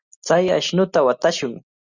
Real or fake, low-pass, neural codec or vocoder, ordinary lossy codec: real; 7.2 kHz; none; Opus, 64 kbps